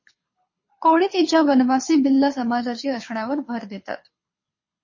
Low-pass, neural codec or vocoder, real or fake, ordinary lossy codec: 7.2 kHz; codec, 24 kHz, 6 kbps, HILCodec; fake; MP3, 32 kbps